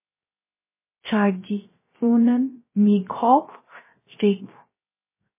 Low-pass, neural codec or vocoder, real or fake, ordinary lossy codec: 3.6 kHz; codec, 16 kHz, 0.3 kbps, FocalCodec; fake; MP3, 16 kbps